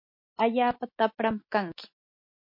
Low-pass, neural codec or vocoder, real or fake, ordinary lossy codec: 5.4 kHz; none; real; MP3, 32 kbps